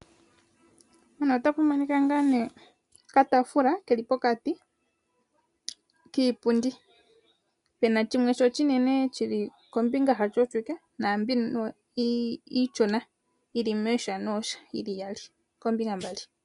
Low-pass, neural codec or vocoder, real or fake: 10.8 kHz; none; real